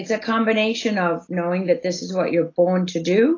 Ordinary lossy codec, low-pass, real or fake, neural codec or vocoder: AAC, 32 kbps; 7.2 kHz; real; none